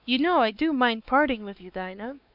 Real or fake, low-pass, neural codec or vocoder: fake; 5.4 kHz; codec, 16 kHz, 4 kbps, X-Codec, WavLM features, trained on Multilingual LibriSpeech